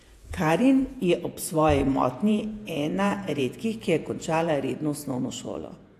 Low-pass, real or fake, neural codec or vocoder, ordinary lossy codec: 14.4 kHz; fake; vocoder, 48 kHz, 128 mel bands, Vocos; AAC, 64 kbps